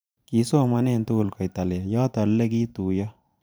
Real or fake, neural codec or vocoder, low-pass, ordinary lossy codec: fake; vocoder, 44.1 kHz, 128 mel bands every 512 samples, BigVGAN v2; none; none